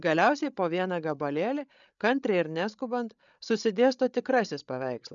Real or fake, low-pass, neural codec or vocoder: fake; 7.2 kHz; codec, 16 kHz, 8 kbps, FreqCodec, larger model